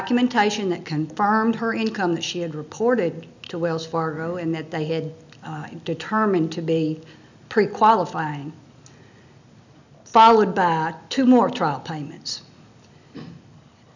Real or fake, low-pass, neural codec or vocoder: real; 7.2 kHz; none